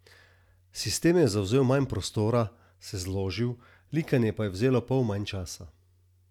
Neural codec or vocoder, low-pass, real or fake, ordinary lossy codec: none; 19.8 kHz; real; none